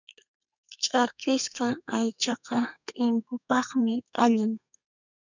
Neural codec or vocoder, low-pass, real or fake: codec, 32 kHz, 1.9 kbps, SNAC; 7.2 kHz; fake